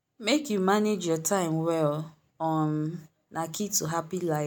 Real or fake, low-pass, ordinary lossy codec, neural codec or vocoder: real; none; none; none